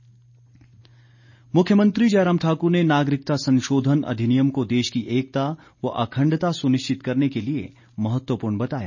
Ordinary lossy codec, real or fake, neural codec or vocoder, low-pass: none; real; none; 7.2 kHz